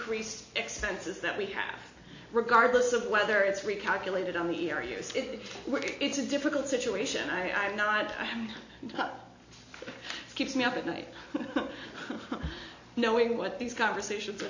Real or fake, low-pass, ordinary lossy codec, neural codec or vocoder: real; 7.2 kHz; AAC, 48 kbps; none